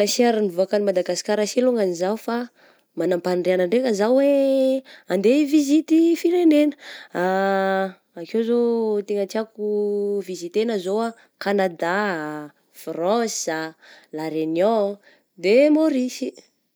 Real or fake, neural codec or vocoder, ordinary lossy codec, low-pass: real; none; none; none